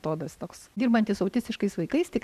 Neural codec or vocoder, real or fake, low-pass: none; real; 14.4 kHz